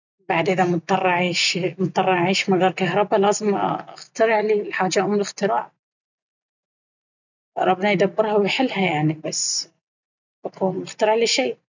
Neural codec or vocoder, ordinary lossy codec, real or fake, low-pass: none; none; real; 7.2 kHz